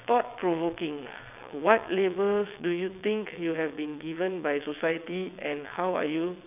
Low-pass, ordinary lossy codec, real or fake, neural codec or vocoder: 3.6 kHz; none; fake; vocoder, 22.05 kHz, 80 mel bands, WaveNeXt